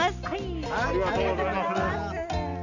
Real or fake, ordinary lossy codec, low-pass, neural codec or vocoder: real; none; 7.2 kHz; none